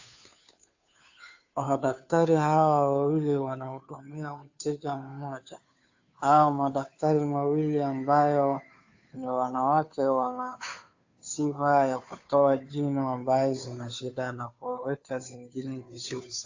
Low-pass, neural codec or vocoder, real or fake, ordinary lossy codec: 7.2 kHz; codec, 16 kHz, 2 kbps, FunCodec, trained on Chinese and English, 25 frames a second; fake; AAC, 48 kbps